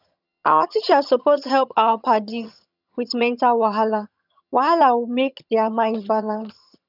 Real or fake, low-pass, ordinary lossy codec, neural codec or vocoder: fake; 5.4 kHz; none; vocoder, 22.05 kHz, 80 mel bands, HiFi-GAN